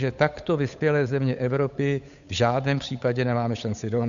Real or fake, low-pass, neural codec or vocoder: fake; 7.2 kHz; codec, 16 kHz, 8 kbps, FunCodec, trained on Chinese and English, 25 frames a second